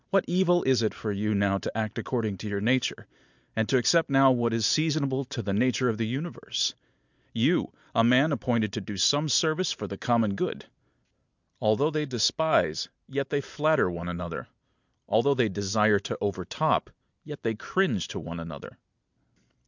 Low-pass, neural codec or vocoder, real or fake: 7.2 kHz; none; real